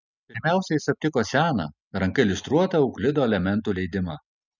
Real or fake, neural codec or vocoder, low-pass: real; none; 7.2 kHz